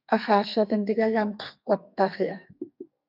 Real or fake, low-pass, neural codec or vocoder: fake; 5.4 kHz; codec, 32 kHz, 1.9 kbps, SNAC